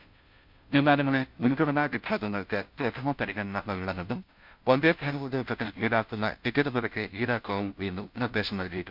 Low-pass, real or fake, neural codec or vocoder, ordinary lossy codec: 5.4 kHz; fake; codec, 16 kHz, 0.5 kbps, FunCodec, trained on Chinese and English, 25 frames a second; MP3, 48 kbps